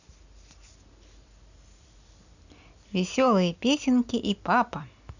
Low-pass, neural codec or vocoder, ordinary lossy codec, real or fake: 7.2 kHz; none; none; real